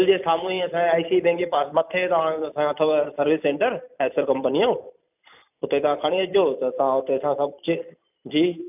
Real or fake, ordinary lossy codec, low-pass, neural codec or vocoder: fake; none; 3.6 kHz; vocoder, 44.1 kHz, 128 mel bands every 256 samples, BigVGAN v2